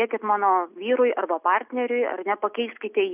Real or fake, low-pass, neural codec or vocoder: real; 3.6 kHz; none